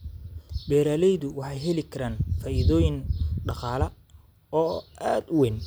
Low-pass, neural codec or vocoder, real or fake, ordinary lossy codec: none; none; real; none